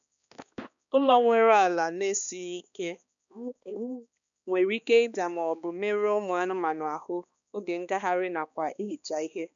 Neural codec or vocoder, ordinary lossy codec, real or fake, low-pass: codec, 16 kHz, 2 kbps, X-Codec, HuBERT features, trained on balanced general audio; none; fake; 7.2 kHz